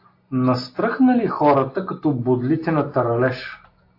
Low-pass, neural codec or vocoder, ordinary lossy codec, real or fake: 5.4 kHz; none; AAC, 32 kbps; real